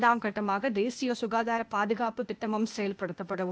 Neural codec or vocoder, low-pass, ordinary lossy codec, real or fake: codec, 16 kHz, 0.8 kbps, ZipCodec; none; none; fake